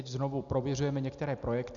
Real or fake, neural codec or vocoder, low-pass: real; none; 7.2 kHz